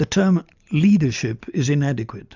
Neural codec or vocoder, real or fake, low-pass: none; real; 7.2 kHz